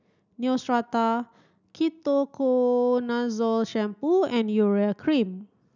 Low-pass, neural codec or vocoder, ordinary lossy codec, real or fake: 7.2 kHz; none; none; real